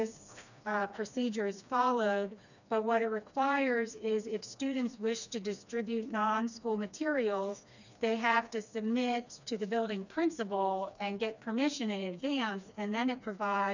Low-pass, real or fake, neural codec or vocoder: 7.2 kHz; fake; codec, 16 kHz, 2 kbps, FreqCodec, smaller model